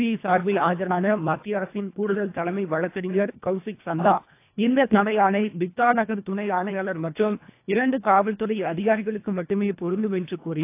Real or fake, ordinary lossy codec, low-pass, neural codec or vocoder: fake; AAC, 24 kbps; 3.6 kHz; codec, 24 kHz, 1.5 kbps, HILCodec